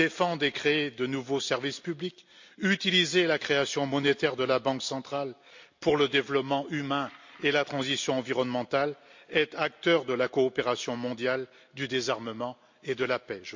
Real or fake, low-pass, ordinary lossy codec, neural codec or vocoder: real; 7.2 kHz; MP3, 48 kbps; none